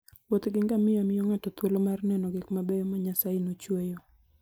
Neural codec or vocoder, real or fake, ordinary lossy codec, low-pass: none; real; none; none